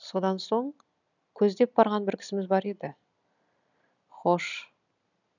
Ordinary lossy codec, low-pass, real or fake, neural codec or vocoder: none; 7.2 kHz; real; none